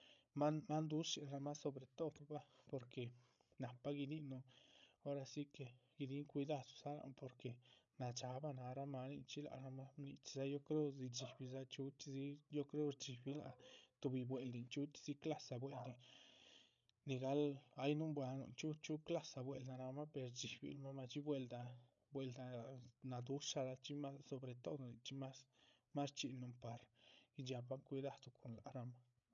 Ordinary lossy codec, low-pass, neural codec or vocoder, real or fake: none; 7.2 kHz; codec, 16 kHz, 16 kbps, FreqCodec, larger model; fake